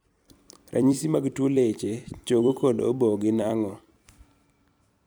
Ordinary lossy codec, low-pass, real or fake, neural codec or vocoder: none; none; fake; vocoder, 44.1 kHz, 128 mel bands every 256 samples, BigVGAN v2